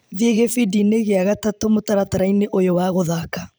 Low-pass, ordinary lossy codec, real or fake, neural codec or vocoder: none; none; fake; vocoder, 44.1 kHz, 128 mel bands every 512 samples, BigVGAN v2